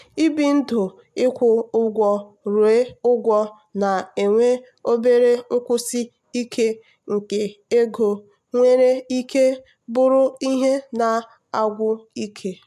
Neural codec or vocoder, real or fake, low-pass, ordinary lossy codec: none; real; 14.4 kHz; MP3, 96 kbps